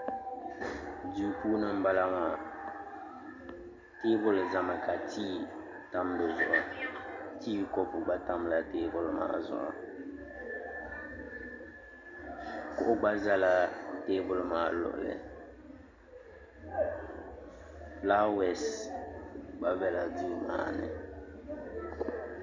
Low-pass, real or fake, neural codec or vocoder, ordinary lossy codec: 7.2 kHz; real; none; MP3, 64 kbps